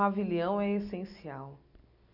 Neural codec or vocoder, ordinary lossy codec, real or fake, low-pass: none; none; real; 5.4 kHz